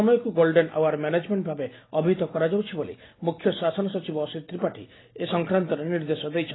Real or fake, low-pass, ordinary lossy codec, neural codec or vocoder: real; 7.2 kHz; AAC, 16 kbps; none